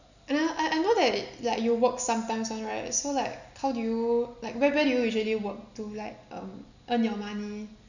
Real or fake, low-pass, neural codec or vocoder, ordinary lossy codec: real; 7.2 kHz; none; none